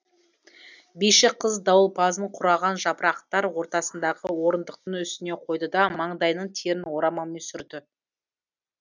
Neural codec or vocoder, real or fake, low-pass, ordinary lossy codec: none; real; 7.2 kHz; none